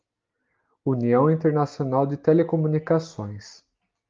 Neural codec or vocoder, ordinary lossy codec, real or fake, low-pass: none; Opus, 32 kbps; real; 7.2 kHz